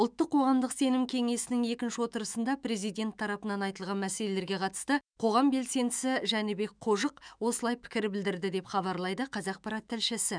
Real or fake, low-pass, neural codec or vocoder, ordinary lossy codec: fake; 9.9 kHz; autoencoder, 48 kHz, 128 numbers a frame, DAC-VAE, trained on Japanese speech; none